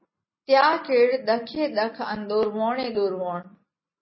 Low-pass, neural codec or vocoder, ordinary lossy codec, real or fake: 7.2 kHz; none; MP3, 24 kbps; real